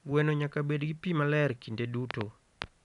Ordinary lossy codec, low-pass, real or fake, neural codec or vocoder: none; 10.8 kHz; real; none